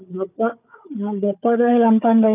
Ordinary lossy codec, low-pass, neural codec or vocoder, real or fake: none; 3.6 kHz; codec, 16 kHz, 4 kbps, FunCodec, trained on Chinese and English, 50 frames a second; fake